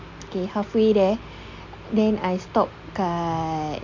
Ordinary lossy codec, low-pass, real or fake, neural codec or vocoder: MP3, 48 kbps; 7.2 kHz; real; none